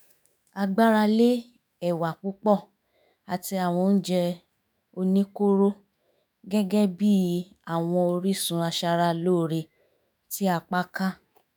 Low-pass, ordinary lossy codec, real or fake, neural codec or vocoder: none; none; fake; autoencoder, 48 kHz, 128 numbers a frame, DAC-VAE, trained on Japanese speech